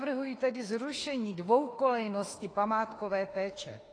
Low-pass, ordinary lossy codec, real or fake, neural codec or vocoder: 9.9 kHz; AAC, 32 kbps; fake; autoencoder, 48 kHz, 32 numbers a frame, DAC-VAE, trained on Japanese speech